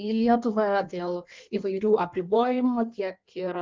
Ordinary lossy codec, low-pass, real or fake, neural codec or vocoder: Opus, 32 kbps; 7.2 kHz; fake; codec, 16 kHz in and 24 kHz out, 1.1 kbps, FireRedTTS-2 codec